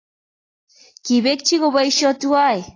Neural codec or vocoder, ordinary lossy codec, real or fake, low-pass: none; AAC, 32 kbps; real; 7.2 kHz